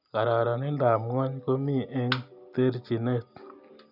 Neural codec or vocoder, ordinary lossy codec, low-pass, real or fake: none; none; 5.4 kHz; real